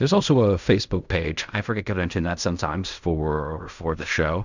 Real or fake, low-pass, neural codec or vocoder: fake; 7.2 kHz; codec, 16 kHz in and 24 kHz out, 0.4 kbps, LongCat-Audio-Codec, fine tuned four codebook decoder